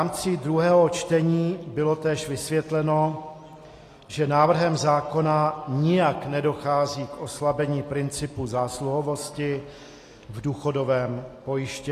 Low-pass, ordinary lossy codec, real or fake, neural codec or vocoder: 14.4 kHz; AAC, 48 kbps; real; none